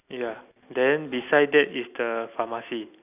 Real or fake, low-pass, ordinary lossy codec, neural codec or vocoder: real; 3.6 kHz; none; none